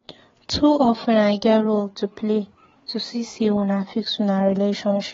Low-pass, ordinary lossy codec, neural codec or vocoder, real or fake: 7.2 kHz; AAC, 24 kbps; codec, 16 kHz, 4 kbps, FreqCodec, larger model; fake